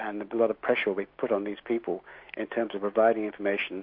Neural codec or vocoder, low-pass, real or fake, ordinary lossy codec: none; 5.4 kHz; real; MP3, 32 kbps